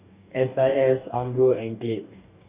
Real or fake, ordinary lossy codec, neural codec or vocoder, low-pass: fake; Opus, 64 kbps; codec, 44.1 kHz, 2.6 kbps, DAC; 3.6 kHz